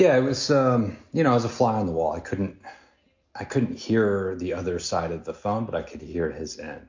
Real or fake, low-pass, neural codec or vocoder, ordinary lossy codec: real; 7.2 kHz; none; MP3, 48 kbps